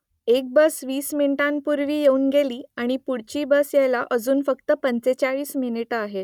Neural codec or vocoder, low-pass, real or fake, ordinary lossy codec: none; 19.8 kHz; real; none